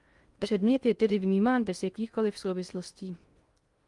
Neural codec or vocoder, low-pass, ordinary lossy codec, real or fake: codec, 16 kHz in and 24 kHz out, 0.6 kbps, FocalCodec, streaming, 2048 codes; 10.8 kHz; Opus, 32 kbps; fake